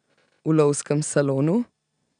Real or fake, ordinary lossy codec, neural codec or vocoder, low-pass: real; none; none; 9.9 kHz